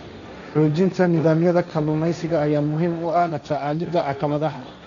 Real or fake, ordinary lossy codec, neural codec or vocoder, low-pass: fake; none; codec, 16 kHz, 1.1 kbps, Voila-Tokenizer; 7.2 kHz